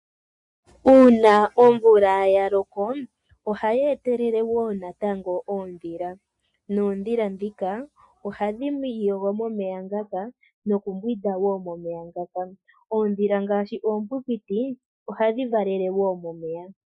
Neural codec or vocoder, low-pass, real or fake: none; 10.8 kHz; real